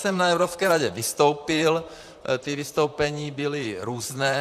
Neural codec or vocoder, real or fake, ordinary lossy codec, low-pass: vocoder, 44.1 kHz, 128 mel bands, Pupu-Vocoder; fake; AAC, 96 kbps; 14.4 kHz